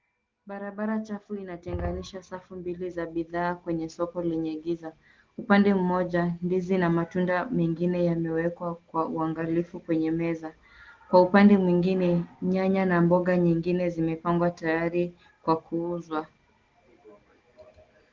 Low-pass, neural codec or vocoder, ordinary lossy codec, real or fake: 7.2 kHz; none; Opus, 16 kbps; real